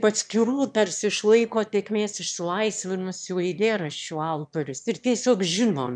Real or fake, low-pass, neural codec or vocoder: fake; 9.9 kHz; autoencoder, 22.05 kHz, a latent of 192 numbers a frame, VITS, trained on one speaker